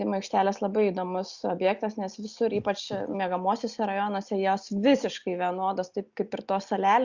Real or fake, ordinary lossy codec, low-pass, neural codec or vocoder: real; Opus, 64 kbps; 7.2 kHz; none